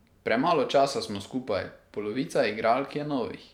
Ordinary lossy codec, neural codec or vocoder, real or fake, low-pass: none; vocoder, 44.1 kHz, 128 mel bands every 512 samples, BigVGAN v2; fake; 19.8 kHz